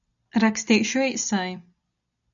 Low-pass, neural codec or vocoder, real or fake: 7.2 kHz; none; real